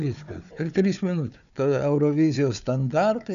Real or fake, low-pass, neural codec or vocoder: fake; 7.2 kHz; codec, 16 kHz, 4 kbps, FunCodec, trained on Chinese and English, 50 frames a second